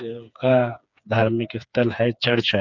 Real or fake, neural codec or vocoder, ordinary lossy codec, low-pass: fake; codec, 16 kHz, 4 kbps, FreqCodec, smaller model; none; 7.2 kHz